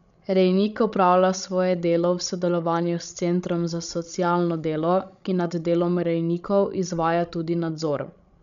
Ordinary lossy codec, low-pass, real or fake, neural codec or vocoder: none; 7.2 kHz; fake; codec, 16 kHz, 16 kbps, FreqCodec, larger model